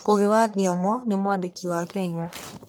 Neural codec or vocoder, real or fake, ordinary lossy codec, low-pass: codec, 44.1 kHz, 1.7 kbps, Pupu-Codec; fake; none; none